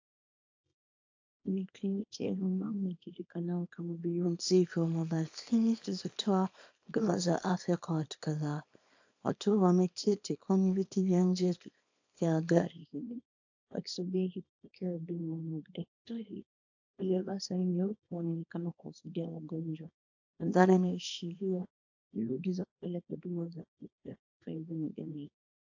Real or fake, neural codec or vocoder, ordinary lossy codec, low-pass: fake; codec, 24 kHz, 0.9 kbps, WavTokenizer, small release; AAC, 48 kbps; 7.2 kHz